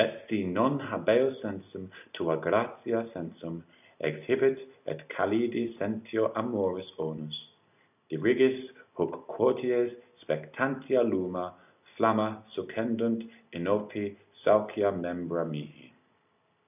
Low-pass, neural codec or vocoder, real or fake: 3.6 kHz; none; real